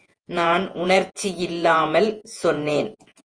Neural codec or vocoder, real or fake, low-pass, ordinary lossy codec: vocoder, 48 kHz, 128 mel bands, Vocos; fake; 9.9 kHz; Opus, 32 kbps